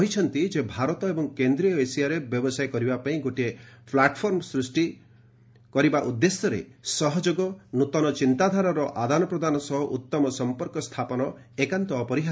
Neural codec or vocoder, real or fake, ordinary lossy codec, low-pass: none; real; none; none